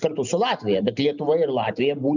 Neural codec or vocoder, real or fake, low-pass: none; real; 7.2 kHz